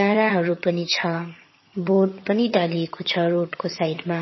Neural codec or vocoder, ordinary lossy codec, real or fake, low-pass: vocoder, 22.05 kHz, 80 mel bands, HiFi-GAN; MP3, 24 kbps; fake; 7.2 kHz